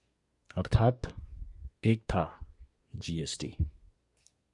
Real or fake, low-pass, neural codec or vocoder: fake; 10.8 kHz; codec, 24 kHz, 1 kbps, SNAC